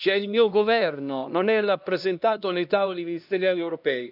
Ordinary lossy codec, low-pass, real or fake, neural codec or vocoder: none; 5.4 kHz; fake; codec, 16 kHz, 1 kbps, X-Codec, HuBERT features, trained on LibriSpeech